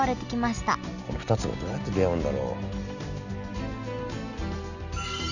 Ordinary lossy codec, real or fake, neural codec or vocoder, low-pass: none; real; none; 7.2 kHz